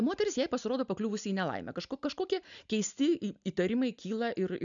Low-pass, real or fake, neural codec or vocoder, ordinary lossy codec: 7.2 kHz; real; none; MP3, 96 kbps